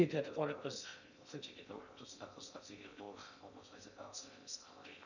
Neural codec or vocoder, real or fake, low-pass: codec, 16 kHz in and 24 kHz out, 0.6 kbps, FocalCodec, streaming, 2048 codes; fake; 7.2 kHz